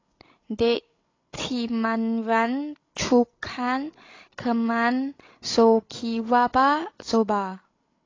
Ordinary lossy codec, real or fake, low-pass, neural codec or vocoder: AAC, 32 kbps; fake; 7.2 kHz; codec, 16 kHz, 16 kbps, FreqCodec, larger model